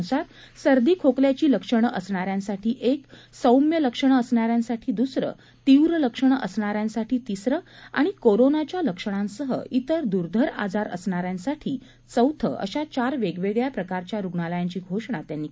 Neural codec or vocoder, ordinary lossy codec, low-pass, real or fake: none; none; none; real